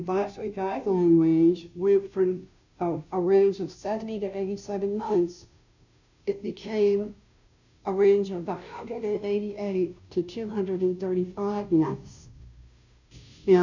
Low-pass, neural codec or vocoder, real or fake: 7.2 kHz; codec, 16 kHz, 0.5 kbps, FunCodec, trained on Chinese and English, 25 frames a second; fake